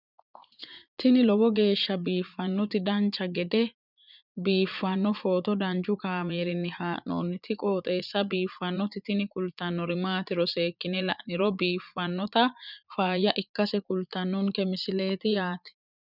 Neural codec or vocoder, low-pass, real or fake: vocoder, 44.1 kHz, 80 mel bands, Vocos; 5.4 kHz; fake